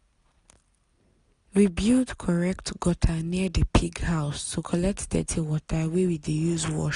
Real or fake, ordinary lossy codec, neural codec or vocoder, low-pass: real; none; none; 10.8 kHz